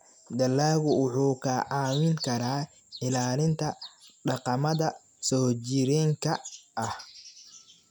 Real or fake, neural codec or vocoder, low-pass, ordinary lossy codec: real; none; 19.8 kHz; none